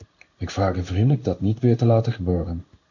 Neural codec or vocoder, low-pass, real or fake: codec, 16 kHz in and 24 kHz out, 1 kbps, XY-Tokenizer; 7.2 kHz; fake